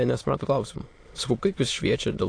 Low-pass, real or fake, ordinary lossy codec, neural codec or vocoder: 9.9 kHz; fake; AAC, 48 kbps; autoencoder, 22.05 kHz, a latent of 192 numbers a frame, VITS, trained on many speakers